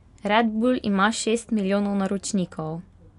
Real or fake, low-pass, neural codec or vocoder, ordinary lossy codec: real; 10.8 kHz; none; none